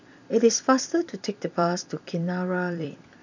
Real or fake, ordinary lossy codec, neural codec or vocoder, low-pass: fake; none; vocoder, 44.1 kHz, 128 mel bands every 256 samples, BigVGAN v2; 7.2 kHz